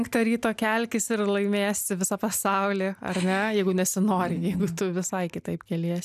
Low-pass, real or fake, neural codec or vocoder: 14.4 kHz; real; none